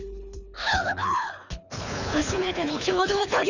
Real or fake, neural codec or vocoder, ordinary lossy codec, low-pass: fake; codec, 24 kHz, 3 kbps, HILCodec; none; 7.2 kHz